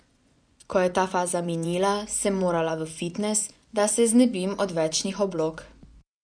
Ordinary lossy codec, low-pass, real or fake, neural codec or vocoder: none; 9.9 kHz; fake; vocoder, 24 kHz, 100 mel bands, Vocos